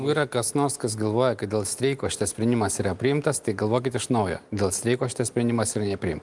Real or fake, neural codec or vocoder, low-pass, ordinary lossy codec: real; none; 10.8 kHz; Opus, 32 kbps